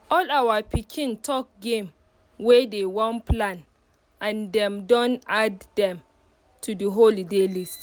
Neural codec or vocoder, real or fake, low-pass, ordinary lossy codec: none; real; none; none